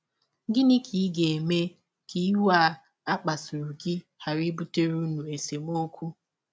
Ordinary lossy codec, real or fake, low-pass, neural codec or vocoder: none; real; none; none